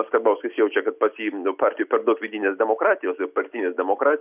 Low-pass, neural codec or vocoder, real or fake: 3.6 kHz; none; real